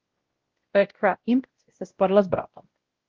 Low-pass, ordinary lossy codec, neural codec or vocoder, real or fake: 7.2 kHz; Opus, 16 kbps; codec, 16 kHz, 0.5 kbps, X-Codec, WavLM features, trained on Multilingual LibriSpeech; fake